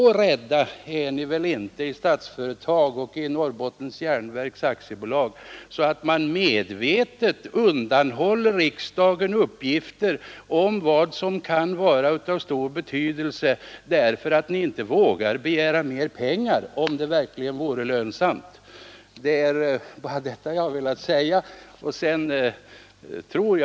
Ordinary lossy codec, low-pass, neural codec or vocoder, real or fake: none; none; none; real